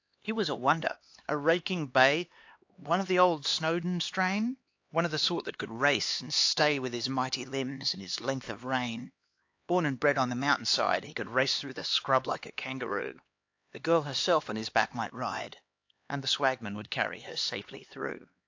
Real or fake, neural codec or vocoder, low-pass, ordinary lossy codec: fake; codec, 16 kHz, 2 kbps, X-Codec, HuBERT features, trained on LibriSpeech; 7.2 kHz; AAC, 48 kbps